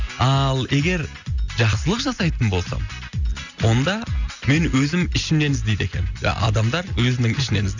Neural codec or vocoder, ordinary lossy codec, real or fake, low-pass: none; none; real; 7.2 kHz